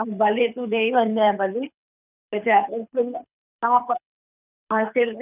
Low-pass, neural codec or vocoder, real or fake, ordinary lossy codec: 3.6 kHz; codec, 24 kHz, 6 kbps, HILCodec; fake; none